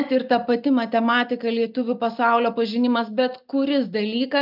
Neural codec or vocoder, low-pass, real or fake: none; 5.4 kHz; real